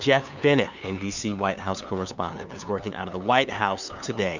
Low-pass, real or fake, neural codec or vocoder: 7.2 kHz; fake; codec, 16 kHz, 2 kbps, FunCodec, trained on LibriTTS, 25 frames a second